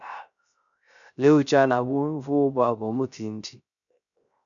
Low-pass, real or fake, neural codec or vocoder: 7.2 kHz; fake; codec, 16 kHz, 0.3 kbps, FocalCodec